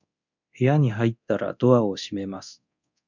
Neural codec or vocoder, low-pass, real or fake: codec, 24 kHz, 0.9 kbps, DualCodec; 7.2 kHz; fake